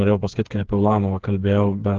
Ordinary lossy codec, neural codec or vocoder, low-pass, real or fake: Opus, 16 kbps; codec, 16 kHz, 4 kbps, FreqCodec, smaller model; 7.2 kHz; fake